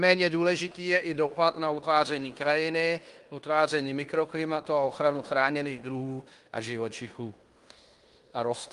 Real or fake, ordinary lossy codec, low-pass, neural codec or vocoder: fake; Opus, 32 kbps; 10.8 kHz; codec, 16 kHz in and 24 kHz out, 0.9 kbps, LongCat-Audio-Codec, fine tuned four codebook decoder